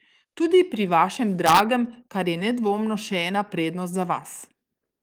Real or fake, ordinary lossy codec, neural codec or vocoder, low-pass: fake; Opus, 32 kbps; codec, 44.1 kHz, 7.8 kbps, DAC; 19.8 kHz